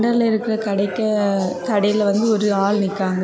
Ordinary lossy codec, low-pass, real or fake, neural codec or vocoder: none; none; real; none